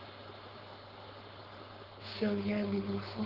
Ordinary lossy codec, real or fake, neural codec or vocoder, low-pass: Opus, 16 kbps; fake; codec, 16 kHz, 4.8 kbps, FACodec; 5.4 kHz